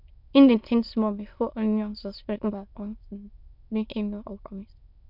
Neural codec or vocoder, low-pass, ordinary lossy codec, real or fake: autoencoder, 22.05 kHz, a latent of 192 numbers a frame, VITS, trained on many speakers; 5.4 kHz; none; fake